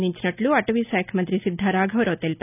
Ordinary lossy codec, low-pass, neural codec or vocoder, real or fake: none; 3.6 kHz; none; real